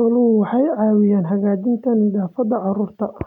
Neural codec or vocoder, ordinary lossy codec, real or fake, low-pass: none; none; real; 19.8 kHz